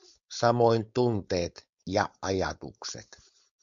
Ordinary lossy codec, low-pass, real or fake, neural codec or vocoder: MP3, 64 kbps; 7.2 kHz; fake; codec, 16 kHz, 4.8 kbps, FACodec